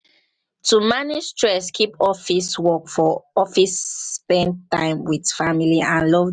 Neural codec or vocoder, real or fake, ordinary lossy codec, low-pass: none; real; none; 9.9 kHz